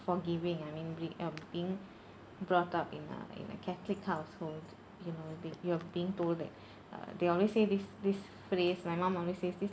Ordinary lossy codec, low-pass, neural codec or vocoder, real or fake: none; none; none; real